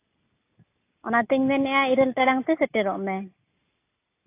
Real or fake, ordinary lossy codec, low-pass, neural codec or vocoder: real; AAC, 24 kbps; 3.6 kHz; none